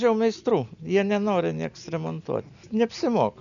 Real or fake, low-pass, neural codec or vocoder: real; 7.2 kHz; none